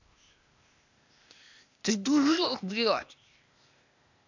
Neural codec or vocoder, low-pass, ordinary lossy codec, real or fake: codec, 16 kHz, 0.8 kbps, ZipCodec; 7.2 kHz; none; fake